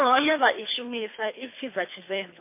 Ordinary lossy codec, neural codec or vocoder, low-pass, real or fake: MP3, 24 kbps; codec, 24 kHz, 3 kbps, HILCodec; 3.6 kHz; fake